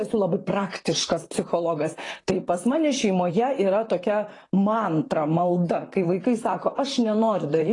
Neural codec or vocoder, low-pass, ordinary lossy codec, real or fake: vocoder, 44.1 kHz, 128 mel bands, Pupu-Vocoder; 10.8 kHz; AAC, 32 kbps; fake